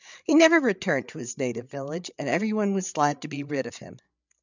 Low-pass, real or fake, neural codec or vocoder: 7.2 kHz; fake; codec, 16 kHz, 8 kbps, FreqCodec, larger model